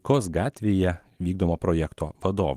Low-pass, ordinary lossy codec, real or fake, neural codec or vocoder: 14.4 kHz; Opus, 24 kbps; real; none